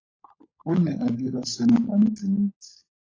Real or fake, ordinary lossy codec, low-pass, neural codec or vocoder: fake; AAC, 32 kbps; 7.2 kHz; codec, 16 kHz, 16 kbps, FunCodec, trained on LibriTTS, 50 frames a second